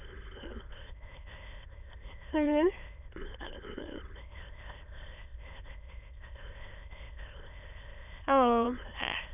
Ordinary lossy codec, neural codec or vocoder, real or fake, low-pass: none; autoencoder, 22.05 kHz, a latent of 192 numbers a frame, VITS, trained on many speakers; fake; 3.6 kHz